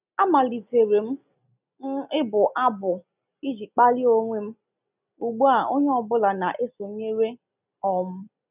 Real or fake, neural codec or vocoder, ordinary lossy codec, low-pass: real; none; none; 3.6 kHz